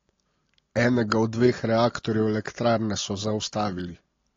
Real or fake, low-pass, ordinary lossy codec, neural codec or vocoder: real; 7.2 kHz; AAC, 32 kbps; none